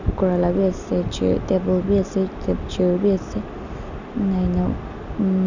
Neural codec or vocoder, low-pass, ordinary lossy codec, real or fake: none; 7.2 kHz; none; real